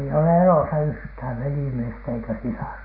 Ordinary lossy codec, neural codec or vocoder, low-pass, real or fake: MP3, 24 kbps; none; 5.4 kHz; real